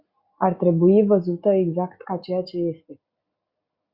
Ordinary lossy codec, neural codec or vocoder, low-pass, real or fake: Opus, 64 kbps; none; 5.4 kHz; real